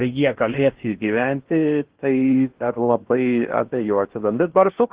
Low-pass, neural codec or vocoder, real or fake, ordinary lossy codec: 3.6 kHz; codec, 16 kHz in and 24 kHz out, 0.8 kbps, FocalCodec, streaming, 65536 codes; fake; Opus, 16 kbps